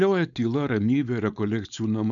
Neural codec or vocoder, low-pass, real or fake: codec, 16 kHz, 4.8 kbps, FACodec; 7.2 kHz; fake